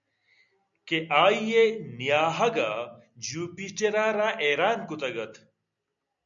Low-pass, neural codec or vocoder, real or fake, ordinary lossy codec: 7.2 kHz; none; real; MP3, 96 kbps